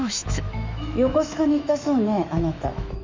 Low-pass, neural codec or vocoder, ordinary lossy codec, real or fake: 7.2 kHz; autoencoder, 48 kHz, 128 numbers a frame, DAC-VAE, trained on Japanese speech; MP3, 64 kbps; fake